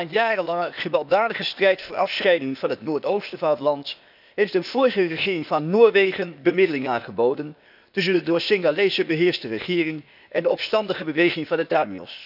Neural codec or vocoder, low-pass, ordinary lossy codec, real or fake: codec, 16 kHz, 0.8 kbps, ZipCodec; 5.4 kHz; none; fake